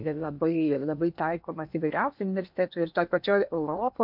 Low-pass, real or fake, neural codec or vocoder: 5.4 kHz; fake; codec, 16 kHz in and 24 kHz out, 0.8 kbps, FocalCodec, streaming, 65536 codes